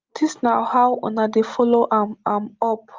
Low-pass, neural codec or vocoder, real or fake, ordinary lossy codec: 7.2 kHz; none; real; Opus, 24 kbps